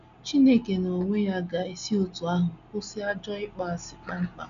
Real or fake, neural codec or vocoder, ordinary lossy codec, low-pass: real; none; none; 7.2 kHz